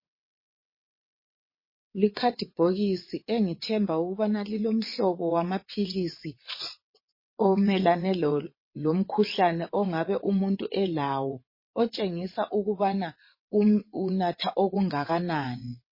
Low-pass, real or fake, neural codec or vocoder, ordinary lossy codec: 5.4 kHz; fake; vocoder, 22.05 kHz, 80 mel bands, WaveNeXt; MP3, 24 kbps